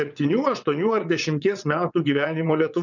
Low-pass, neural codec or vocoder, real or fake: 7.2 kHz; vocoder, 22.05 kHz, 80 mel bands, WaveNeXt; fake